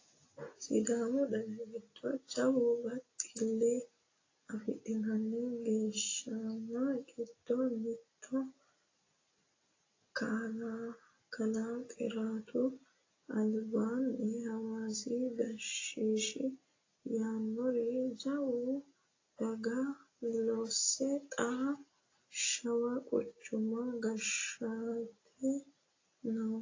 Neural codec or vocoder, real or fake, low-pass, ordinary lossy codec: none; real; 7.2 kHz; AAC, 32 kbps